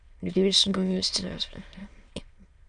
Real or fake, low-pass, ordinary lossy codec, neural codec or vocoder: fake; 9.9 kHz; Opus, 64 kbps; autoencoder, 22.05 kHz, a latent of 192 numbers a frame, VITS, trained on many speakers